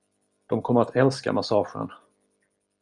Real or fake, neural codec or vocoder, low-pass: real; none; 10.8 kHz